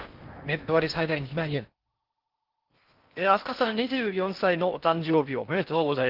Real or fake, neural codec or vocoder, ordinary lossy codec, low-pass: fake; codec, 16 kHz in and 24 kHz out, 0.6 kbps, FocalCodec, streaming, 4096 codes; Opus, 24 kbps; 5.4 kHz